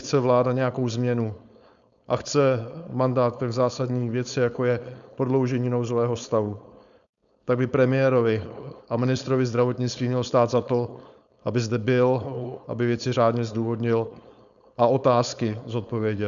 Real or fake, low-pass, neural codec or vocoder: fake; 7.2 kHz; codec, 16 kHz, 4.8 kbps, FACodec